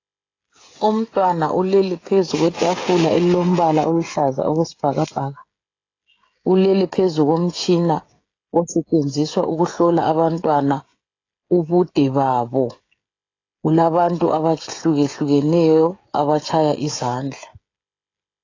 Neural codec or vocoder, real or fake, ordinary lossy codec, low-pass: codec, 16 kHz, 16 kbps, FreqCodec, smaller model; fake; AAC, 32 kbps; 7.2 kHz